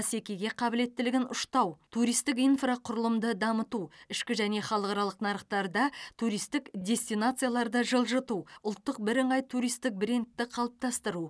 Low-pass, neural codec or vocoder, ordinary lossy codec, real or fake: none; none; none; real